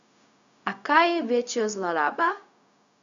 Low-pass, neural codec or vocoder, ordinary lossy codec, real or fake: 7.2 kHz; codec, 16 kHz, 0.4 kbps, LongCat-Audio-Codec; MP3, 96 kbps; fake